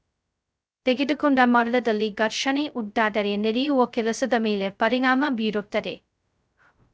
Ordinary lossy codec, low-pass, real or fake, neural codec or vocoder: none; none; fake; codec, 16 kHz, 0.2 kbps, FocalCodec